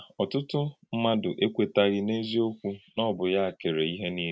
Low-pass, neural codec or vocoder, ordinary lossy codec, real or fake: none; none; none; real